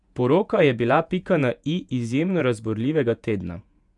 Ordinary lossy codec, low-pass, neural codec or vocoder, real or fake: none; 10.8 kHz; vocoder, 48 kHz, 128 mel bands, Vocos; fake